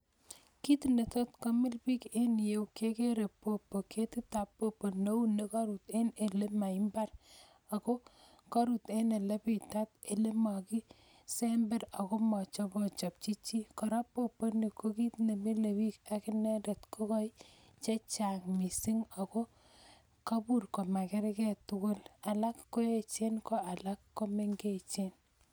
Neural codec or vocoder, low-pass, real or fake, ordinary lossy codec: none; none; real; none